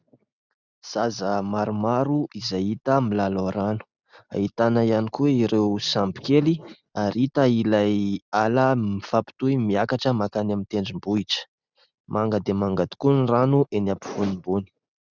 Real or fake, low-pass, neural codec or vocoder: real; 7.2 kHz; none